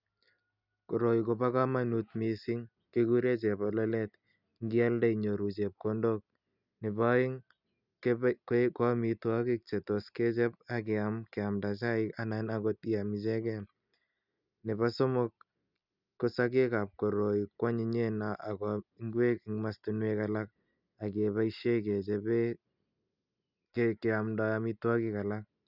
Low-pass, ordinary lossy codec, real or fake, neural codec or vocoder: 5.4 kHz; none; real; none